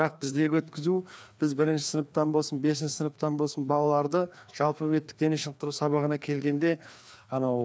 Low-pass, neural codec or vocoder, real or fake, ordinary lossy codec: none; codec, 16 kHz, 2 kbps, FreqCodec, larger model; fake; none